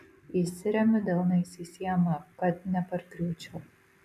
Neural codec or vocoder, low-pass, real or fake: none; 14.4 kHz; real